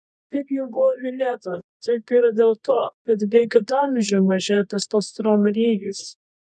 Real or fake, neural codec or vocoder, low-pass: fake; codec, 24 kHz, 0.9 kbps, WavTokenizer, medium music audio release; 10.8 kHz